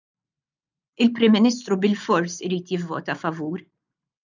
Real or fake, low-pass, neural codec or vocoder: real; 7.2 kHz; none